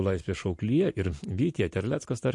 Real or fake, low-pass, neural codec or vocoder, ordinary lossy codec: real; 10.8 kHz; none; MP3, 48 kbps